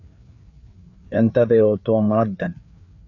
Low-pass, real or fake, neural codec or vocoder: 7.2 kHz; fake; codec, 16 kHz, 4 kbps, FreqCodec, larger model